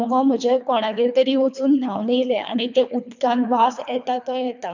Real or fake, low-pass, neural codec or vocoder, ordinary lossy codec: fake; 7.2 kHz; codec, 24 kHz, 3 kbps, HILCodec; none